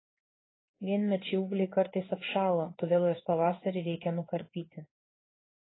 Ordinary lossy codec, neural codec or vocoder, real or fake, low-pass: AAC, 16 kbps; codec, 16 kHz in and 24 kHz out, 1 kbps, XY-Tokenizer; fake; 7.2 kHz